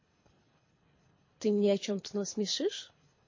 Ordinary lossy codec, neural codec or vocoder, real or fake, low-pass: MP3, 32 kbps; codec, 24 kHz, 3 kbps, HILCodec; fake; 7.2 kHz